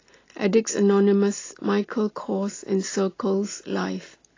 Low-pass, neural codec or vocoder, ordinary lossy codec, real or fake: 7.2 kHz; none; AAC, 32 kbps; real